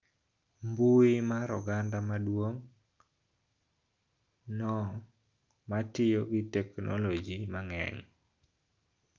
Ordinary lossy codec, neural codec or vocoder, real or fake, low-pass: Opus, 24 kbps; none; real; 7.2 kHz